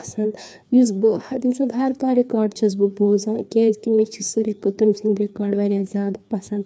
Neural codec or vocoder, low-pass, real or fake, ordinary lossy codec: codec, 16 kHz, 2 kbps, FreqCodec, larger model; none; fake; none